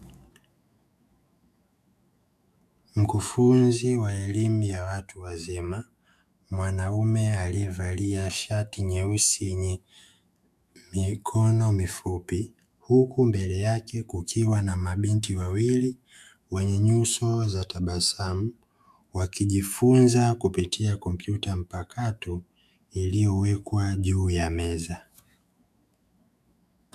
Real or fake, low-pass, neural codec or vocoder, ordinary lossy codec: fake; 14.4 kHz; autoencoder, 48 kHz, 128 numbers a frame, DAC-VAE, trained on Japanese speech; MP3, 96 kbps